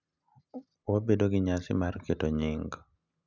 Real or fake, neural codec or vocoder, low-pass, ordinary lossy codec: real; none; 7.2 kHz; none